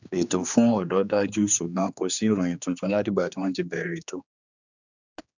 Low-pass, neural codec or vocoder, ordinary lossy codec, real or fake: 7.2 kHz; codec, 16 kHz, 2 kbps, X-Codec, HuBERT features, trained on general audio; none; fake